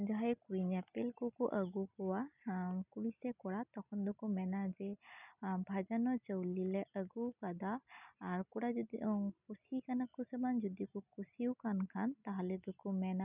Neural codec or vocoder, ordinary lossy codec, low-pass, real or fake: none; none; 3.6 kHz; real